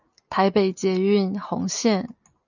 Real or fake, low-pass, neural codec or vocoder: real; 7.2 kHz; none